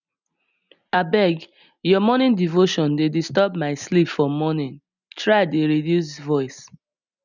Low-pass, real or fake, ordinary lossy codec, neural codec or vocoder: 7.2 kHz; real; none; none